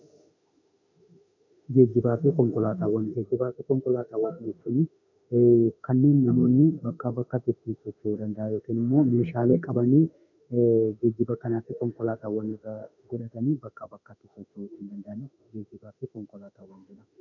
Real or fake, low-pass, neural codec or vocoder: fake; 7.2 kHz; autoencoder, 48 kHz, 32 numbers a frame, DAC-VAE, trained on Japanese speech